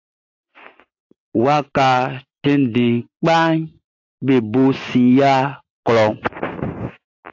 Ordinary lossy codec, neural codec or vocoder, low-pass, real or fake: AAC, 48 kbps; none; 7.2 kHz; real